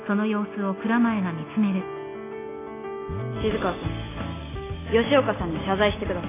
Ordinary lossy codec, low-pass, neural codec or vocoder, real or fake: AAC, 16 kbps; 3.6 kHz; none; real